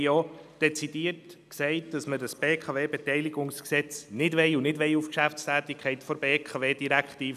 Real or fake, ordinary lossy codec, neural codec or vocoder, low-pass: real; none; none; 14.4 kHz